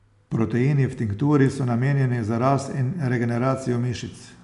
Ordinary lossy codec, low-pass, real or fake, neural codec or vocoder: none; 10.8 kHz; real; none